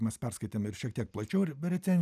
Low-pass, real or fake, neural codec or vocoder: 14.4 kHz; real; none